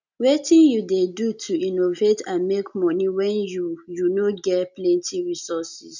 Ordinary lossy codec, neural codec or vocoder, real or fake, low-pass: none; none; real; 7.2 kHz